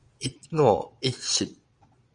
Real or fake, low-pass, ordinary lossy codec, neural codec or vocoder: fake; 9.9 kHz; Opus, 64 kbps; vocoder, 22.05 kHz, 80 mel bands, Vocos